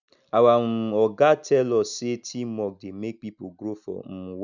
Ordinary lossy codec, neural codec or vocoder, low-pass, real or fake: none; none; 7.2 kHz; real